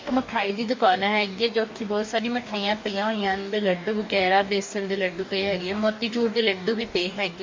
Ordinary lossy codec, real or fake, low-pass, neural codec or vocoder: MP3, 48 kbps; fake; 7.2 kHz; codec, 44.1 kHz, 2.6 kbps, DAC